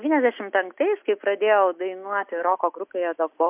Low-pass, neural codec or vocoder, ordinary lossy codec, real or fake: 3.6 kHz; none; MP3, 32 kbps; real